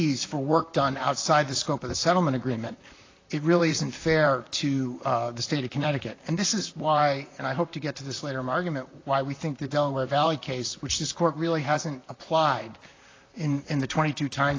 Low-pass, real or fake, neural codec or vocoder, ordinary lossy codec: 7.2 kHz; fake; vocoder, 44.1 kHz, 128 mel bands, Pupu-Vocoder; AAC, 32 kbps